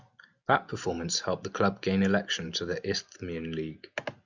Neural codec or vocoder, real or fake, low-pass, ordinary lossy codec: none; real; 7.2 kHz; Opus, 64 kbps